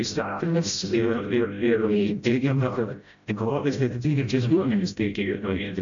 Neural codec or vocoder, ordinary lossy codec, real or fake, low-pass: codec, 16 kHz, 0.5 kbps, FreqCodec, smaller model; AAC, 64 kbps; fake; 7.2 kHz